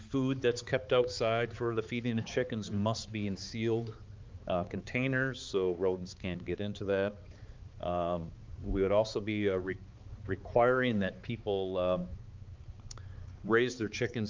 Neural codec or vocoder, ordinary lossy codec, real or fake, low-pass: codec, 16 kHz, 4 kbps, X-Codec, HuBERT features, trained on balanced general audio; Opus, 24 kbps; fake; 7.2 kHz